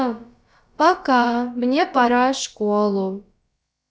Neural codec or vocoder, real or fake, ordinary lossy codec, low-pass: codec, 16 kHz, about 1 kbps, DyCAST, with the encoder's durations; fake; none; none